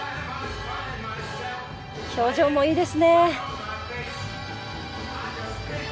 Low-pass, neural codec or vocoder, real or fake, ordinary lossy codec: none; none; real; none